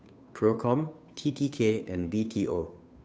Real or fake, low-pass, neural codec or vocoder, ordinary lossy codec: fake; none; codec, 16 kHz, 2 kbps, FunCodec, trained on Chinese and English, 25 frames a second; none